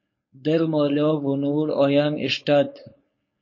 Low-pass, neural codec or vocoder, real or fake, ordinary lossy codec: 7.2 kHz; codec, 16 kHz, 4.8 kbps, FACodec; fake; MP3, 32 kbps